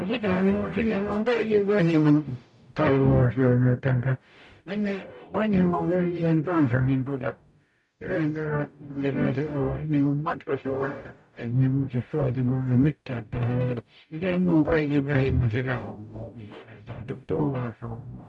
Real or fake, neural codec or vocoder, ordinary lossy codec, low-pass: fake; codec, 44.1 kHz, 0.9 kbps, DAC; none; 10.8 kHz